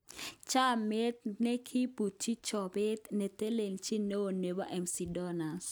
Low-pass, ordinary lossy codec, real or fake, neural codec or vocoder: none; none; real; none